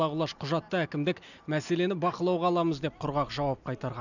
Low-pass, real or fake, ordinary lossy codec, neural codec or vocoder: 7.2 kHz; real; none; none